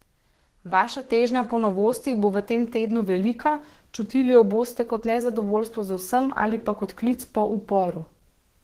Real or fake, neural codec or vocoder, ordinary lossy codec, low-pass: fake; codec, 32 kHz, 1.9 kbps, SNAC; Opus, 16 kbps; 14.4 kHz